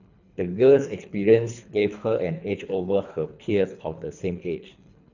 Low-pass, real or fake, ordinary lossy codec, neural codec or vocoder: 7.2 kHz; fake; none; codec, 24 kHz, 3 kbps, HILCodec